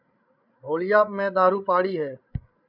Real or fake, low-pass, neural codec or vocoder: fake; 5.4 kHz; codec, 16 kHz, 16 kbps, FreqCodec, larger model